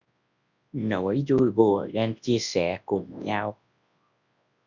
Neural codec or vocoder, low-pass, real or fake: codec, 24 kHz, 0.9 kbps, WavTokenizer, large speech release; 7.2 kHz; fake